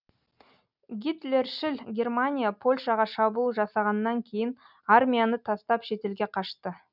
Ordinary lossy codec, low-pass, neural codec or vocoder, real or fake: none; 5.4 kHz; none; real